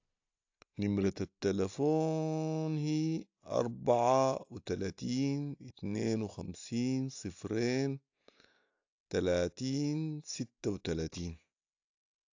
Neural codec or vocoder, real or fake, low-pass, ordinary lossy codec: none; real; 7.2 kHz; AAC, 48 kbps